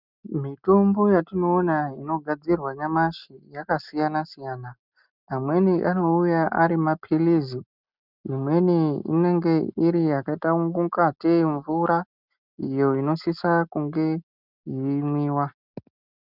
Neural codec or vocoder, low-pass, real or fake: none; 5.4 kHz; real